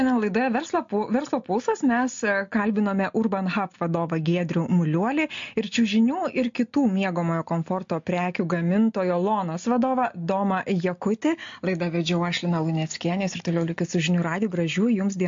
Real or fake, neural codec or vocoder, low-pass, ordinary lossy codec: real; none; 7.2 kHz; MP3, 48 kbps